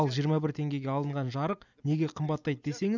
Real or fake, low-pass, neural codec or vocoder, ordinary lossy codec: real; 7.2 kHz; none; none